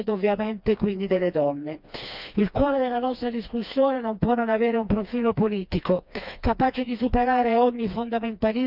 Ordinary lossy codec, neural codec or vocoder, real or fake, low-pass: none; codec, 16 kHz, 2 kbps, FreqCodec, smaller model; fake; 5.4 kHz